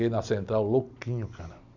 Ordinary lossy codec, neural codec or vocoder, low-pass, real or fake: none; none; 7.2 kHz; real